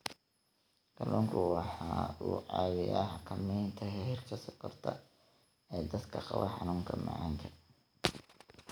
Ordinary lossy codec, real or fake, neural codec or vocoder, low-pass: none; real; none; none